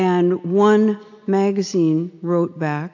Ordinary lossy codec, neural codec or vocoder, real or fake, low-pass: AAC, 48 kbps; none; real; 7.2 kHz